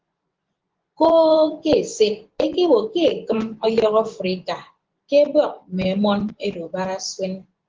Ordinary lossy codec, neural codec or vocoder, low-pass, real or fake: Opus, 16 kbps; vocoder, 24 kHz, 100 mel bands, Vocos; 7.2 kHz; fake